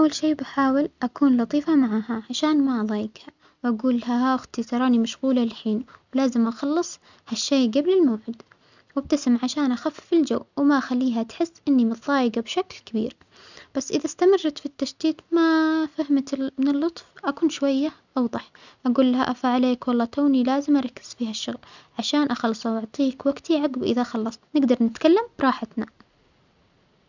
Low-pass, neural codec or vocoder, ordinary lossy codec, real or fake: 7.2 kHz; none; none; real